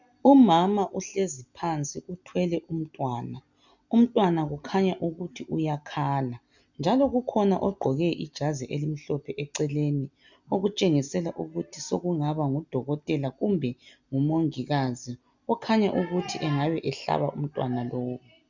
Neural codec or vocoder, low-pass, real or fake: none; 7.2 kHz; real